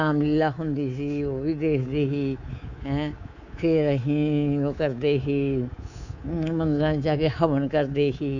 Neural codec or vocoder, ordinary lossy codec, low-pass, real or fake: codec, 24 kHz, 3.1 kbps, DualCodec; none; 7.2 kHz; fake